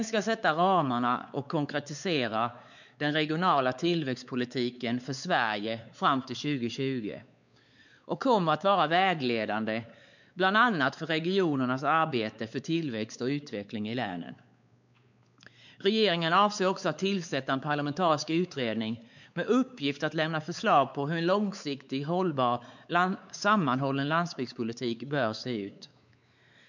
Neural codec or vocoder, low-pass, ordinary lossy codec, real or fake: codec, 16 kHz, 4 kbps, X-Codec, WavLM features, trained on Multilingual LibriSpeech; 7.2 kHz; none; fake